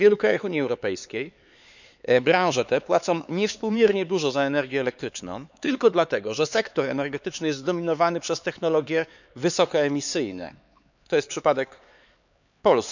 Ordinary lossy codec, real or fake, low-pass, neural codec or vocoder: none; fake; 7.2 kHz; codec, 16 kHz, 4 kbps, X-Codec, HuBERT features, trained on LibriSpeech